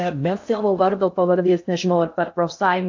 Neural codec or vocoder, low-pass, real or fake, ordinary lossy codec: codec, 16 kHz in and 24 kHz out, 0.6 kbps, FocalCodec, streaming, 2048 codes; 7.2 kHz; fake; AAC, 48 kbps